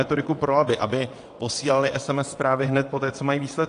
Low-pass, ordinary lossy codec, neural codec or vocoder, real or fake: 9.9 kHz; AAC, 48 kbps; vocoder, 22.05 kHz, 80 mel bands, WaveNeXt; fake